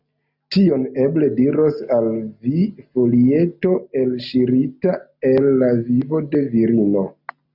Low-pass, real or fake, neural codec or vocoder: 5.4 kHz; real; none